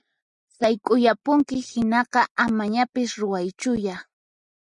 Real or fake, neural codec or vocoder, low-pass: real; none; 10.8 kHz